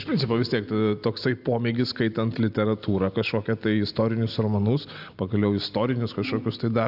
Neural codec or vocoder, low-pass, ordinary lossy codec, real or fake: none; 5.4 kHz; AAC, 48 kbps; real